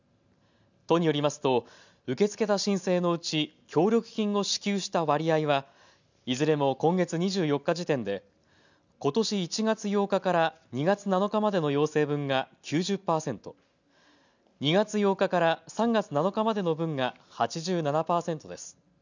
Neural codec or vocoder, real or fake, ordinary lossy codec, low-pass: none; real; none; 7.2 kHz